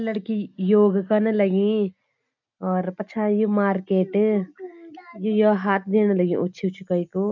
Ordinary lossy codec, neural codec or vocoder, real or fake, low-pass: none; none; real; 7.2 kHz